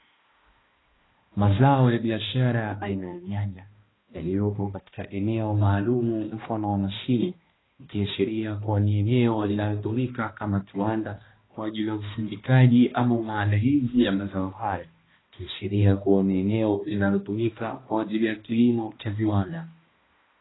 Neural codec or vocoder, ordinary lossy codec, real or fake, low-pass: codec, 16 kHz, 1 kbps, X-Codec, HuBERT features, trained on balanced general audio; AAC, 16 kbps; fake; 7.2 kHz